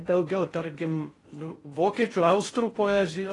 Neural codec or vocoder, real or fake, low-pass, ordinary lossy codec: codec, 16 kHz in and 24 kHz out, 0.6 kbps, FocalCodec, streaming, 2048 codes; fake; 10.8 kHz; AAC, 32 kbps